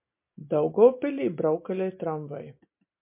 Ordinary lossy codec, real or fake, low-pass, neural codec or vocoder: MP3, 32 kbps; real; 3.6 kHz; none